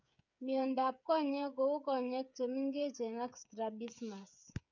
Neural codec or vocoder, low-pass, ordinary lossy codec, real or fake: codec, 16 kHz, 8 kbps, FreqCodec, smaller model; 7.2 kHz; none; fake